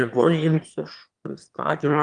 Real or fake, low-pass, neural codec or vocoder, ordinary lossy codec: fake; 9.9 kHz; autoencoder, 22.05 kHz, a latent of 192 numbers a frame, VITS, trained on one speaker; Opus, 32 kbps